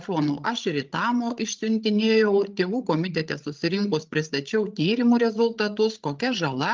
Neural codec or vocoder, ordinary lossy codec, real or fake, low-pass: codec, 16 kHz, 16 kbps, FreqCodec, larger model; Opus, 32 kbps; fake; 7.2 kHz